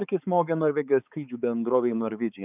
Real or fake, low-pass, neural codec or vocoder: fake; 3.6 kHz; codec, 16 kHz, 4 kbps, X-Codec, HuBERT features, trained on balanced general audio